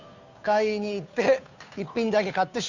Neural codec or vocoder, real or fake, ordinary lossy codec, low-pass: codec, 16 kHz, 2 kbps, FunCodec, trained on Chinese and English, 25 frames a second; fake; none; 7.2 kHz